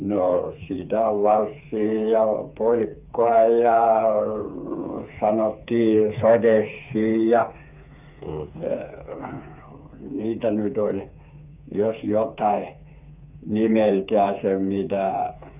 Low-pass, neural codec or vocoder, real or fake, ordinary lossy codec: 3.6 kHz; codec, 16 kHz, 4 kbps, FreqCodec, smaller model; fake; none